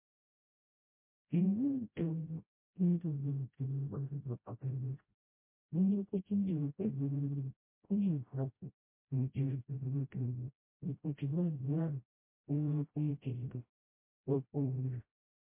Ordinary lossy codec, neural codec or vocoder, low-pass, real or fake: MP3, 24 kbps; codec, 16 kHz, 0.5 kbps, FreqCodec, smaller model; 3.6 kHz; fake